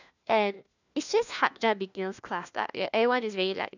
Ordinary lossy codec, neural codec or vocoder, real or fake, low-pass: none; codec, 16 kHz, 1 kbps, FunCodec, trained on LibriTTS, 50 frames a second; fake; 7.2 kHz